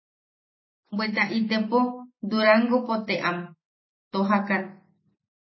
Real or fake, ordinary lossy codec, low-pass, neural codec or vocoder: real; MP3, 24 kbps; 7.2 kHz; none